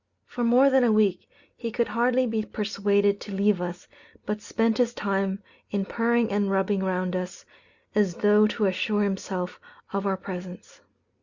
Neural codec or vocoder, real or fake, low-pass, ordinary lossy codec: none; real; 7.2 kHz; Opus, 64 kbps